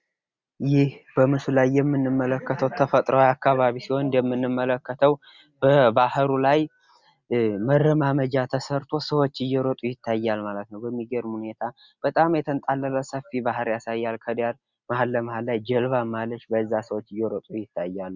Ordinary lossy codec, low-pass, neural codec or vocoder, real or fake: Opus, 64 kbps; 7.2 kHz; none; real